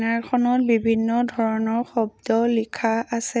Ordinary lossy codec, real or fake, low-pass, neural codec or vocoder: none; real; none; none